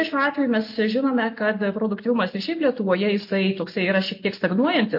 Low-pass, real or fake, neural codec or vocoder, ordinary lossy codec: 5.4 kHz; real; none; MP3, 32 kbps